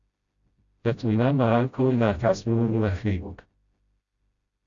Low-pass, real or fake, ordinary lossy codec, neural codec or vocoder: 7.2 kHz; fake; Opus, 64 kbps; codec, 16 kHz, 0.5 kbps, FreqCodec, smaller model